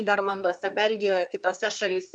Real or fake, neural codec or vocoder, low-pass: fake; codec, 24 kHz, 1 kbps, SNAC; 9.9 kHz